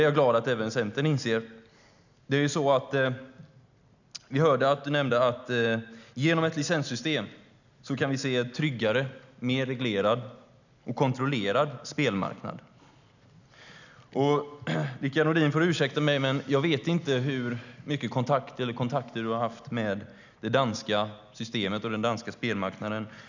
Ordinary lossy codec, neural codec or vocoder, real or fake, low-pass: none; none; real; 7.2 kHz